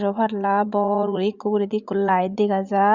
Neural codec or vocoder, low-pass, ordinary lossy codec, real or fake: vocoder, 44.1 kHz, 80 mel bands, Vocos; 7.2 kHz; Opus, 64 kbps; fake